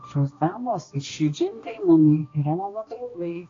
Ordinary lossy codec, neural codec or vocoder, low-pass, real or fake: AAC, 32 kbps; codec, 16 kHz, 1 kbps, X-Codec, HuBERT features, trained on balanced general audio; 7.2 kHz; fake